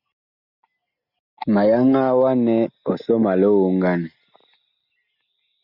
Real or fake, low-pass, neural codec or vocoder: real; 5.4 kHz; none